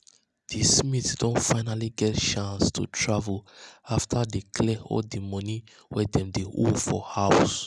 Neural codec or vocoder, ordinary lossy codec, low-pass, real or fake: none; none; none; real